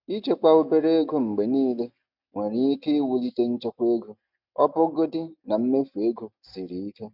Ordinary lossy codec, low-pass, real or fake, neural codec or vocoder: none; 5.4 kHz; real; none